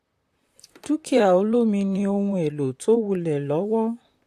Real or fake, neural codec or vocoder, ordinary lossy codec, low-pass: fake; vocoder, 44.1 kHz, 128 mel bands, Pupu-Vocoder; AAC, 64 kbps; 14.4 kHz